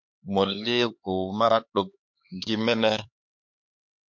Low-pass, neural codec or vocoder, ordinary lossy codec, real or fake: 7.2 kHz; codec, 16 kHz, 4 kbps, X-Codec, HuBERT features, trained on LibriSpeech; MP3, 48 kbps; fake